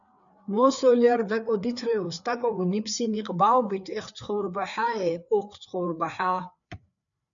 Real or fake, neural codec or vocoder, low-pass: fake; codec, 16 kHz, 4 kbps, FreqCodec, larger model; 7.2 kHz